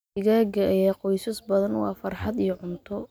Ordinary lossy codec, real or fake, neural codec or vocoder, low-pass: none; real; none; none